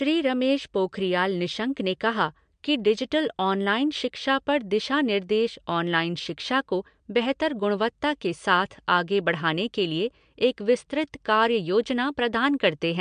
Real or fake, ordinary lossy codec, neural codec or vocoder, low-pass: real; MP3, 64 kbps; none; 9.9 kHz